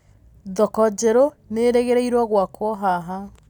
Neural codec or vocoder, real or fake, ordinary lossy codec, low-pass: none; real; none; 19.8 kHz